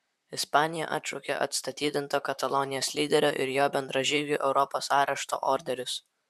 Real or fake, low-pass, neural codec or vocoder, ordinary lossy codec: fake; 14.4 kHz; vocoder, 44.1 kHz, 128 mel bands, Pupu-Vocoder; MP3, 96 kbps